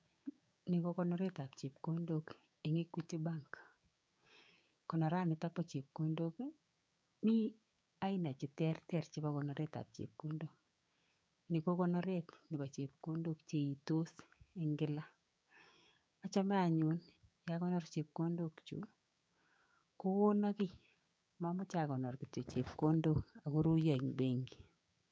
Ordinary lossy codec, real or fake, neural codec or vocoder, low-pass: none; fake; codec, 16 kHz, 6 kbps, DAC; none